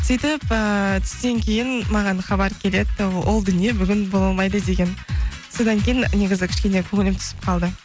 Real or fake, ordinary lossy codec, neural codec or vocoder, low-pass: real; none; none; none